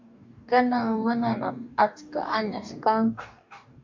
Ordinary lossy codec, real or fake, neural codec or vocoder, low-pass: MP3, 64 kbps; fake; codec, 44.1 kHz, 2.6 kbps, DAC; 7.2 kHz